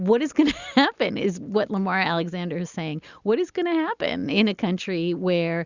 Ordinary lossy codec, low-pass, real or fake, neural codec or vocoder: Opus, 64 kbps; 7.2 kHz; real; none